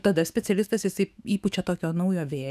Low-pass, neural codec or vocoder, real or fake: 14.4 kHz; autoencoder, 48 kHz, 128 numbers a frame, DAC-VAE, trained on Japanese speech; fake